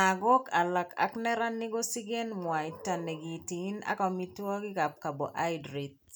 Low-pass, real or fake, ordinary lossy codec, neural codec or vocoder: none; real; none; none